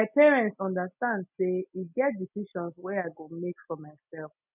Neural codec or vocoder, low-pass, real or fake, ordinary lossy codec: none; 3.6 kHz; real; none